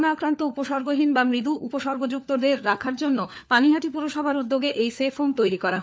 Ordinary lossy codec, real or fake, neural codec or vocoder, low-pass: none; fake; codec, 16 kHz, 4 kbps, FunCodec, trained on LibriTTS, 50 frames a second; none